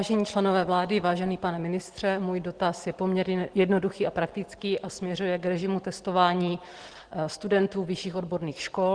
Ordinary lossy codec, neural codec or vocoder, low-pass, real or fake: Opus, 16 kbps; none; 9.9 kHz; real